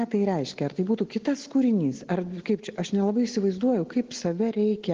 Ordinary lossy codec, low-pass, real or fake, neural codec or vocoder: Opus, 16 kbps; 7.2 kHz; real; none